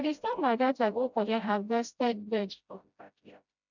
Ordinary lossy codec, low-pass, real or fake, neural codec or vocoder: none; 7.2 kHz; fake; codec, 16 kHz, 0.5 kbps, FreqCodec, smaller model